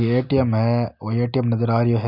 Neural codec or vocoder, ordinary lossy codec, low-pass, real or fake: none; none; 5.4 kHz; real